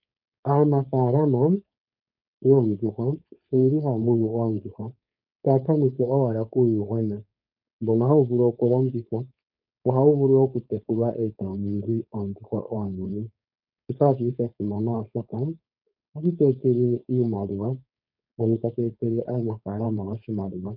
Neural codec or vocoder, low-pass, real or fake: codec, 16 kHz, 4.8 kbps, FACodec; 5.4 kHz; fake